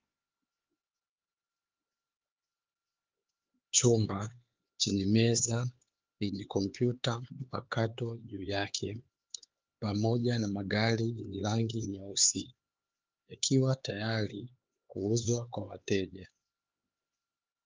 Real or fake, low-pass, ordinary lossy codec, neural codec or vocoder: fake; 7.2 kHz; Opus, 32 kbps; codec, 16 kHz, 4 kbps, X-Codec, HuBERT features, trained on LibriSpeech